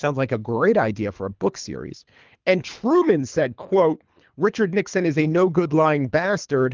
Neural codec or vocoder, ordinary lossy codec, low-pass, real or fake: codec, 24 kHz, 3 kbps, HILCodec; Opus, 24 kbps; 7.2 kHz; fake